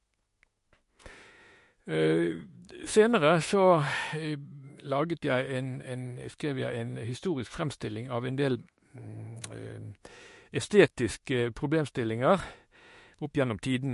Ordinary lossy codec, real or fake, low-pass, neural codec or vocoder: MP3, 48 kbps; fake; 14.4 kHz; autoencoder, 48 kHz, 32 numbers a frame, DAC-VAE, trained on Japanese speech